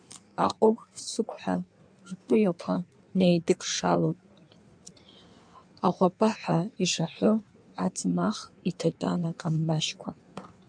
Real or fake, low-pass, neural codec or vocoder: fake; 9.9 kHz; codec, 16 kHz in and 24 kHz out, 1.1 kbps, FireRedTTS-2 codec